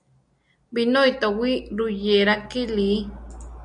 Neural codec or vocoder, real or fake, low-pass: none; real; 9.9 kHz